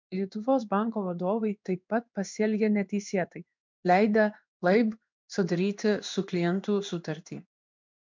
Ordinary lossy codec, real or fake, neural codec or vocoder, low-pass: MP3, 64 kbps; fake; codec, 16 kHz in and 24 kHz out, 1 kbps, XY-Tokenizer; 7.2 kHz